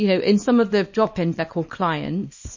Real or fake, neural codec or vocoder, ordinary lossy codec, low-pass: fake; codec, 24 kHz, 0.9 kbps, WavTokenizer, small release; MP3, 32 kbps; 7.2 kHz